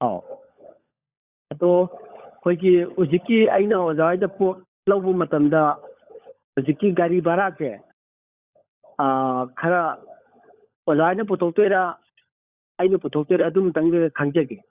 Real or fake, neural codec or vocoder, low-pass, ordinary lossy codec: fake; codec, 16 kHz, 16 kbps, FunCodec, trained on LibriTTS, 50 frames a second; 3.6 kHz; Opus, 64 kbps